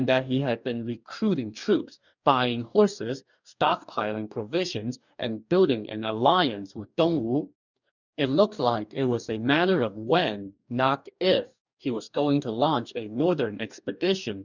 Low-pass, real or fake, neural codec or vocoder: 7.2 kHz; fake; codec, 44.1 kHz, 2.6 kbps, DAC